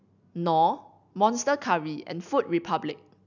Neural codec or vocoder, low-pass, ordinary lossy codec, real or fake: none; 7.2 kHz; none; real